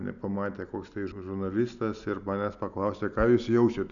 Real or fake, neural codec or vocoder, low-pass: real; none; 7.2 kHz